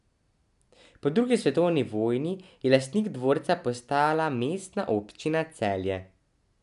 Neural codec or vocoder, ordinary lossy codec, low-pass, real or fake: none; none; 10.8 kHz; real